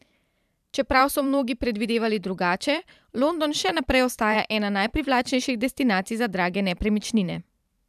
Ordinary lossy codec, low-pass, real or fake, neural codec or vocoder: none; 14.4 kHz; fake; vocoder, 44.1 kHz, 128 mel bands every 512 samples, BigVGAN v2